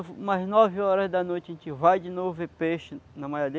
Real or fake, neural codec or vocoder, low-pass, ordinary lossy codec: real; none; none; none